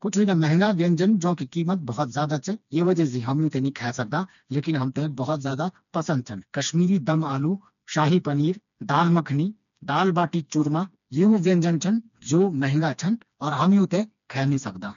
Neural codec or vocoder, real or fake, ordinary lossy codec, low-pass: codec, 16 kHz, 2 kbps, FreqCodec, smaller model; fake; MP3, 96 kbps; 7.2 kHz